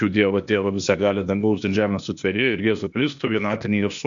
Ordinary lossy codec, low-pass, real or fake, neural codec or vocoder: MP3, 64 kbps; 7.2 kHz; fake; codec, 16 kHz, 0.8 kbps, ZipCodec